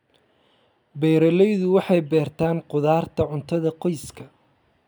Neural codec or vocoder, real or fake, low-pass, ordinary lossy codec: none; real; none; none